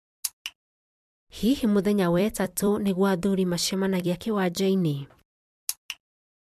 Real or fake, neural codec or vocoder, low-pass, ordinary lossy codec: fake; vocoder, 44.1 kHz, 128 mel bands every 256 samples, BigVGAN v2; 14.4 kHz; MP3, 96 kbps